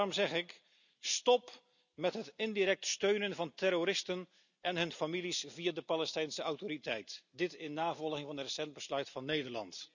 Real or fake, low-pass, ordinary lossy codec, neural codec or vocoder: real; 7.2 kHz; none; none